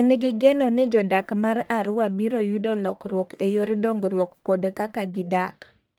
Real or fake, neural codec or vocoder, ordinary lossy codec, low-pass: fake; codec, 44.1 kHz, 1.7 kbps, Pupu-Codec; none; none